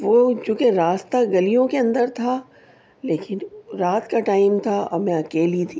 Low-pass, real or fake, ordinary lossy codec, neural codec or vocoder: none; real; none; none